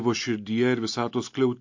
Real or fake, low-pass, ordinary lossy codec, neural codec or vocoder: real; 7.2 kHz; MP3, 48 kbps; none